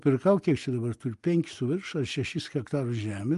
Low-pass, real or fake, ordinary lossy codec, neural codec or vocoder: 10.8 kHz; real; Opus, 32 kbps; none